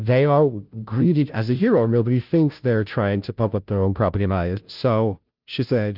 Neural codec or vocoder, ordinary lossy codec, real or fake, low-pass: codec, 16 kHz, 0.5 kbps, FunCodec, trained on Chinese and English, 25 frames a second; Opus, 24 kbps; fake; 5.4 kHz